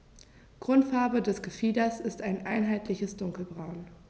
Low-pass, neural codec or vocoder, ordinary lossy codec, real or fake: none; none; none; real